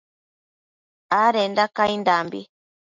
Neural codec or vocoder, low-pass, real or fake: none; 7.2 kHz; real